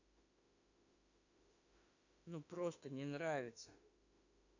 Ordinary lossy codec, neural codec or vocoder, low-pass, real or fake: none; autoencoder, 48 kHz, 32 numbers a frame, DAC-VAE, trained on Japanese speech; 7.2 kHz; fake